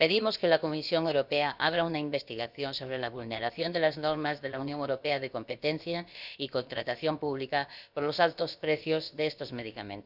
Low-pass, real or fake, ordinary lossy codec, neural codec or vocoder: 5.4 kHz; fake; none; codec, 16 kHz, about 1 kbps, DyCAST, with the encoder's durations